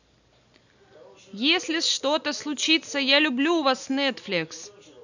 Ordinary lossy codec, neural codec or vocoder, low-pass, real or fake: none; none; 7.2 kHz; real